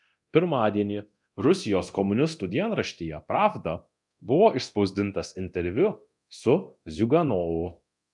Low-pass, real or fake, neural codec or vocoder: 10.8 kHz; fake; codec, 24 kHz, 0.9 kbps, DualCodec